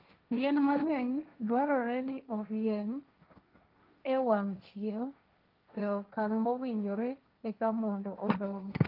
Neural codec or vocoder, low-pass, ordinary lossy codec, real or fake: codec, 16 kHz, 1.1 kbps, Voila-Tokenizer; 5.4 kHz; Opus, 24 kbps; fake